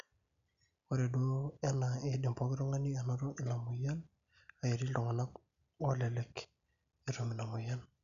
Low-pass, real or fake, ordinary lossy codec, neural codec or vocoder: 7.2 kHz; real; none; none